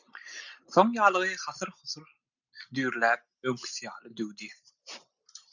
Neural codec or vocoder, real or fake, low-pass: none; real; 7.2 kHz